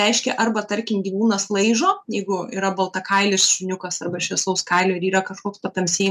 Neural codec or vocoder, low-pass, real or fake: none; 14.4 kHz; real